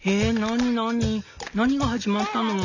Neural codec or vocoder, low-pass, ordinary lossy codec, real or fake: none; 7.2 kHz; none; real